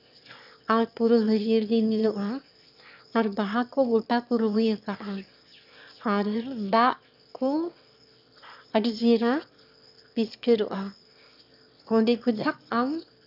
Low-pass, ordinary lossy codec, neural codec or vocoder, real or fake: 5.4 kHz; none; autoencoder, 22.05 kHz, a latent of 192 numbers a frame, VITS, trained on one speaker; fake